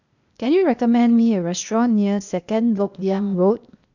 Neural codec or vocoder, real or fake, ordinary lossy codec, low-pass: codec, 16 kHz, 0.8 kbps, ZipCodec; fake; none; 7.2 kHz